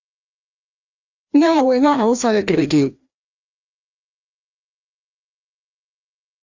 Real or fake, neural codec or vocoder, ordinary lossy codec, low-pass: fake; codec, 16 kHz, 1 kbps, FreqCodec, larger model; Opus, 64 kbps; 7.2 kHz